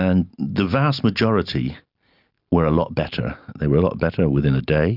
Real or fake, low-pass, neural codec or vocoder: real; 5.4 kHz; none